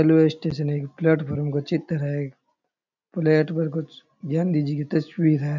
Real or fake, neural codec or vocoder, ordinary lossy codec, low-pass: real; none; none; 7.2 kHz